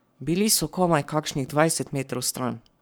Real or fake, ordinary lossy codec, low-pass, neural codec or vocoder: fake; none; none; codec, 44.1 kHz, 7.8 kbps, Pupu-Codec